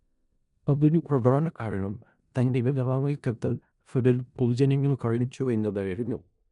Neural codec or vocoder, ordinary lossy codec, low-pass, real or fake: codec, 16 kHz in and 24 kHz out, 0.4 kbps, LongCat-Audio-Codec, four codebook decoder; none; 10.8 kHz; fake